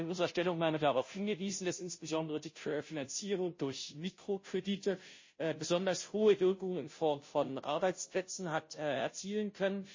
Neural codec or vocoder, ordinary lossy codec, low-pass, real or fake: codec, 16 kHz, 0.5 kbps, FunCodec, trained on Chinese and English, 25 frames a second; MP3, 32 kbps; 7.2 kHz; fake